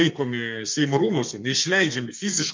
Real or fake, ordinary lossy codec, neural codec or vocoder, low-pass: fake; MP3, 48 kbps; codec, 32 kHz, 1.9 kbps, SNAC; 7.2 kHz